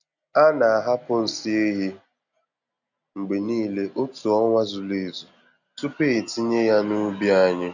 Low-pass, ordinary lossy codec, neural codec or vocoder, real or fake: 7.2 kHz; none; none; real